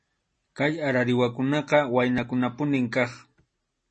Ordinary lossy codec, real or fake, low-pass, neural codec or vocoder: MP3, 32 kbps; real; 10.8 kHz; none